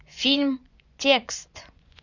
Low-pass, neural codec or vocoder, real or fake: 7.2 kHz; vocoder, 24 kHz, 100 mel bands, Vocos; fake